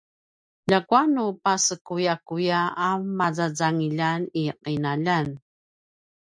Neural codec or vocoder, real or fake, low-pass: none; real; 9.9 kHz